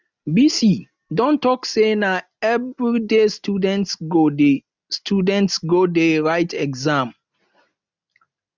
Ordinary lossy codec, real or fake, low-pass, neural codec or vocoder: none; real; 7.2 kHz; none